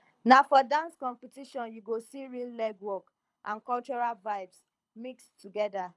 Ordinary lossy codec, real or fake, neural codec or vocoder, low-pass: none; fake; codec, 24 kHz, 6 kbps, HILCodec; none